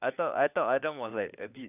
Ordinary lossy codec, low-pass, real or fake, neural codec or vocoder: none; 3.6 kHz; fake; codec, 16 kHz, 4 kbps, FunCodec, trained on LibriTTS, 50 frames a second